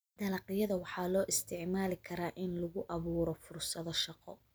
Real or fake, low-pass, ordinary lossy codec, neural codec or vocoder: real; none; none; none